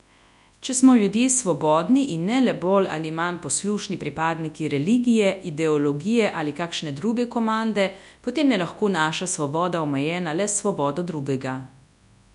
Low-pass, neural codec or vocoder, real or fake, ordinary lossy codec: 10.8 kHz; codec, 24 kHz, 0.9 kbps, WavTokenizer, large speech release; fake; none